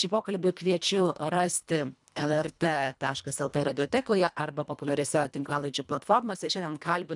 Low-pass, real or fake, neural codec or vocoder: 10.8 kHz; fake; codec, 24 kHz, 1.5 kbps, HILCodec